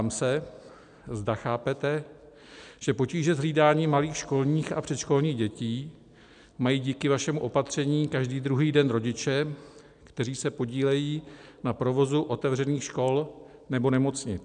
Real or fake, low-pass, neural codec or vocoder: real; 9.9 kHz; none